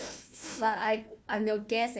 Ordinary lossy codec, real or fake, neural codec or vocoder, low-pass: none; fake; codec, 16 kHz, 1 kbps, FunCodec, trained on Chinese and English, 50 frames a second; none